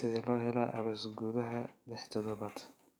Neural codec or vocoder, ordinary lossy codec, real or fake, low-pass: codec, 44.1 kHz, 7.8 kbps, DAC; none; fake; none